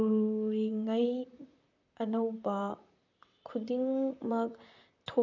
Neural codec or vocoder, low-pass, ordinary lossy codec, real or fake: vocoder, 44.1 kHz, 128 mel bands, Pupu-Vocoder; 7.2 kHz; none; fake